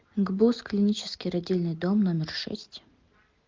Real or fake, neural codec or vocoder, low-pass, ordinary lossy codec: real; none; 7.2 kHz; Opus, 32 kbps